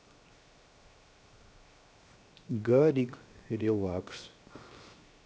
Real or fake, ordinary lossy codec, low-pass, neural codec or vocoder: fake; none; none; codec, 16 kHz, 0.7 kbps, FocalCodec